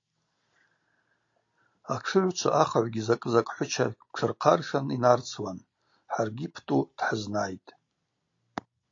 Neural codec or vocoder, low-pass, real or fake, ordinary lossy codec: none; 7.2 kHz; real; AAC, 48 kbps